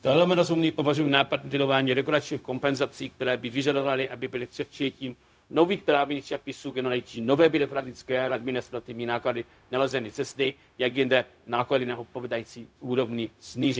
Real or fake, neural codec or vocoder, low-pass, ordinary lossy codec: fake; codec, 16 kHz, 0.4 kbps, LongCat-Audio-Codec; none; none